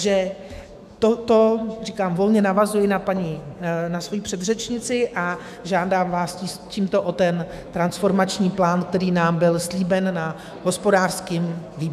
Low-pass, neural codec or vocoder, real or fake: 14.4 kHz; autoencoder, 48 kHz, 128 numbers a frame, DAC-VAE, trained on Japanese speech; fake